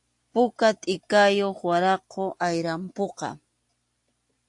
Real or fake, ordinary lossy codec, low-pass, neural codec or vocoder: real; AAC, 64 kbps; 10.8 kHz; none